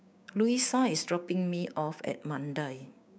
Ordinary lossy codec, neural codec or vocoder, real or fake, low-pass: none; codec, 16 kHz, 6 kbps, DAC; fake; none